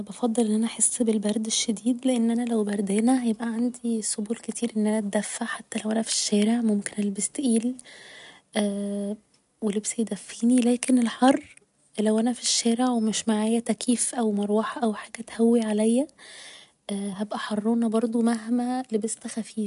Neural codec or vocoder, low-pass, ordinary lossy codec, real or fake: none; 10.8 kHz; none; real